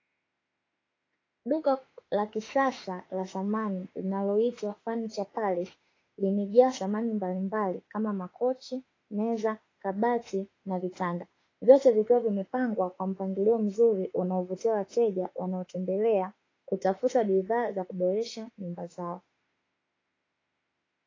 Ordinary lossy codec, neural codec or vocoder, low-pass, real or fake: AAC, 32 kbps; autoencoder, 48 kHz, 32 numbers a frame, DAC-VAE, trained on Japanese speech; 7.2 kHz; fake